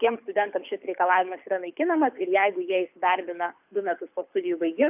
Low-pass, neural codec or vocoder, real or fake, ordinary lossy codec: 3.6 kHz; codec, 24 kHz, 6 kbps, HILCodec; fake; AAC, 32 kbps